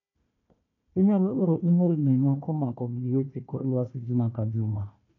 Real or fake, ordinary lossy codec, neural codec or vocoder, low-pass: fake; none; codec, 16 kHz, 1 kbps, FunCodec, trained on Chinese and English, 50 frames a second; 7.2 kHz